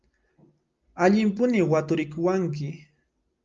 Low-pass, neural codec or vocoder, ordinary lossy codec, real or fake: 7.2 kHz; none; Opus, 32 kbps; real